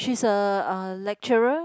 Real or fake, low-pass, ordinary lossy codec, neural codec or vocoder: real; none; none; none